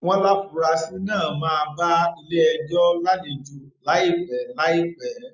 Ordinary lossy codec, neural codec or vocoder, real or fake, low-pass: none; none; real; 7.2 kHz